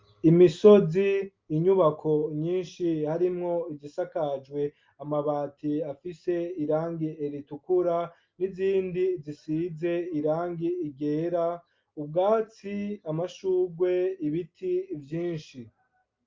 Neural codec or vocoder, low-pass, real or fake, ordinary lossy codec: none; 7.2 kHz; real; Opus, 24 kbps